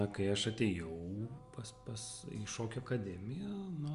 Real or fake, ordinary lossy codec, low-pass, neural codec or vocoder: real; Opus, 64 kbps; 10.8 kHz; none